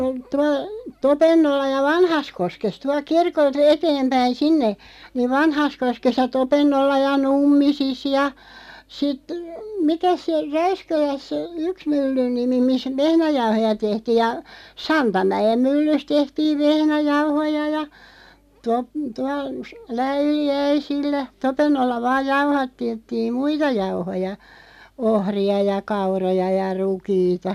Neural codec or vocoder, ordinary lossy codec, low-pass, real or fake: none; none; 14.4 kHz; real